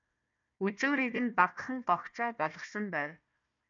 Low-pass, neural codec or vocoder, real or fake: 7.2 kHz; codec, 16 kHz, 1 kbps, FunCodec, trained on Chinese and English, 50 frames a second; fake